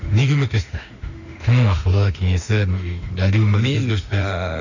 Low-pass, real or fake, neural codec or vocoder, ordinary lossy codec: 7.2 kHz; fake; autoencoder, 48 kHz, 32 numbers a frame, DAC-VAE, trained on Japanese speech; none